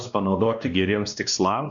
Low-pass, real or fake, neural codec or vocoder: 7.2 kHz; fake; codec, 16 kHz, 1 kbps, X-Codec, WavLM features, trained on Multilingual LibriSpeech